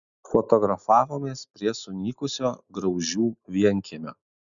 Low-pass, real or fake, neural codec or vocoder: 7.2 kHz; real; none